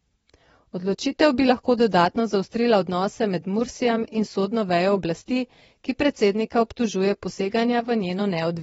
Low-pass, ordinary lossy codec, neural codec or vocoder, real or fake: 9.9 kHz; AAC, 24 kbps; vocoder, 22.05 kHz, 80 mel bands, WaveNeXt; fake